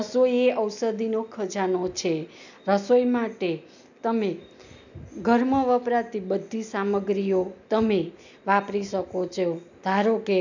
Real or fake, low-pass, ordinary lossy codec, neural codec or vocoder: real; 7.2 kHz; none; none